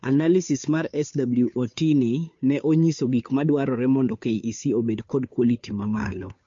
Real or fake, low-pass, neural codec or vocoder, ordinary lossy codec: fake; 7.2 kHz; codec, 16 kHz, 2 kbps, FunCodec, trained on Chinese and English, 25 frames a second; MP3, 48 kbps